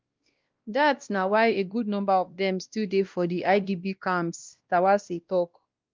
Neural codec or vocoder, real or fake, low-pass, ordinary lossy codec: codec, 16 kHz, 1 kbps, X-Codec, WavLM features, trained on Multilingual LibriSpeech; fake; 7.2 kHz; Opus, 32 kbps